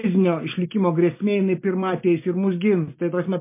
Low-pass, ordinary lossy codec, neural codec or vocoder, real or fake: 3.6 kHz; MP3, 24 kbps; none; real